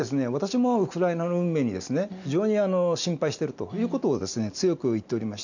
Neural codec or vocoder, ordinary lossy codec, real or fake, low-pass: none; none; real; 7.2 kHz